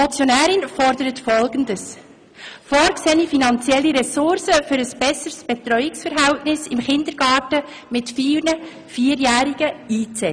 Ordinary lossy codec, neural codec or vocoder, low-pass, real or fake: none; none; 9.9 kHz; real